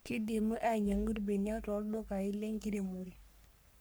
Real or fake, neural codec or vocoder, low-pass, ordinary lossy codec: fake; codec, 44.1 kHz, 3.4 kbps, Pupu-Codec; none; none